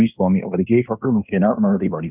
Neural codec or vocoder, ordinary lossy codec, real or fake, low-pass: codec, 16 kHz in and 24 kHz out, 0.9 kbps, LongCat-Audio-Codec, fine tuned four codebook decoder; none; fake; 3.6 kHz